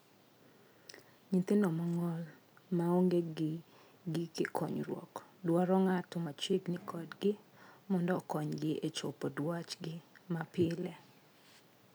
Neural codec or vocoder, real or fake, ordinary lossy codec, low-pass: none; real; none; none